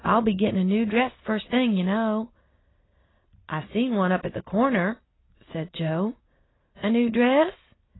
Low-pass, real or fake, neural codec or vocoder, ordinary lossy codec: 7.2 kHz; real; none; AAC, 16 kbps